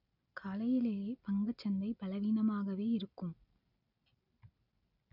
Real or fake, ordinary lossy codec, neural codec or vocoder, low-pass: real; none; none; 5.4 kHz